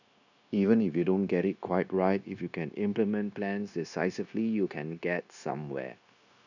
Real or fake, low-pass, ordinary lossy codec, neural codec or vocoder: fake; 7.2 kHz; none; codec, 16 kHz, 0.9 kbps, LongCat-Audio-Codec